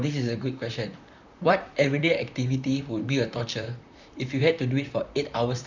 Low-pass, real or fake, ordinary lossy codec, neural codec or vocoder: 7.2 kHz; fake; none; vocoder, 44.1 kHz, 128 mel bands, Pupu-Vocoder